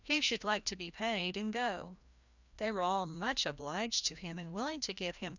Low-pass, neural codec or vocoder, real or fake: 7.2 kHz; codec, 16 kHz, 1 kbps, FreqCodec, larger model; fake